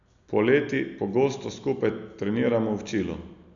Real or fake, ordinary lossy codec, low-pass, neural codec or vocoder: real; none; 7.2 kHz; none